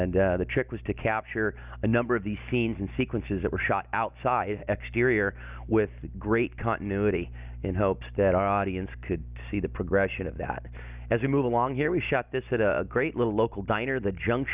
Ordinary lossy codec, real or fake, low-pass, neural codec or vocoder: Opus, 64 kbps; real; 3.6 kHz; none